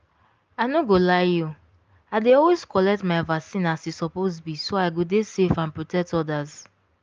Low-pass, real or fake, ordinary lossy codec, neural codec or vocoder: 7.2 kHz; real; Opus, 24 kbps; none